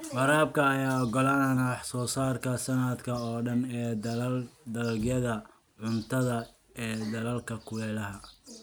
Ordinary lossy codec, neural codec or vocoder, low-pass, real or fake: none; none; none; real